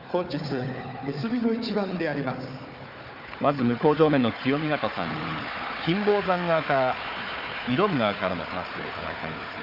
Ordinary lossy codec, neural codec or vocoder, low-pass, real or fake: none; codec, 16 kHz, 4 kbps, FunCodec, trained on Chinese and English, 50 frames a second; 5.4 kHz; fake